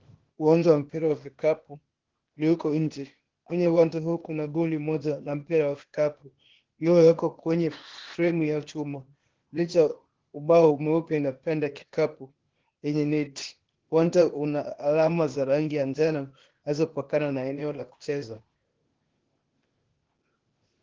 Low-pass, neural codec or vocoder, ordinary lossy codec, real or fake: 7.2 kHz; codec, 16 kHz, 0.8 kbps, ZipCodec; Opus, 16 kbps; fake